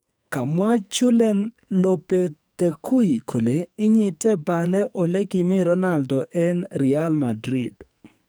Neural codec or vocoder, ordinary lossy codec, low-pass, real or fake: codec, 44.1 kHz, 2.6 kbps, SNAC; none; none; fake